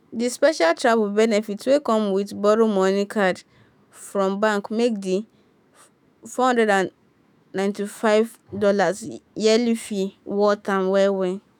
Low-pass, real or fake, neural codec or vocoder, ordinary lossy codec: none; fake; autoencoder, 48 kHz, 128 numbers a frame, DAC-VAE, trained on Japanese speech; none